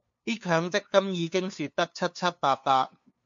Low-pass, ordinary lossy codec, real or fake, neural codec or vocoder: 7.2 kHz; MP3, 48 kbps; fake; codec, 16 kHz, 2 kbps, FunCodec, trained on LibriTTS, 25 frames a second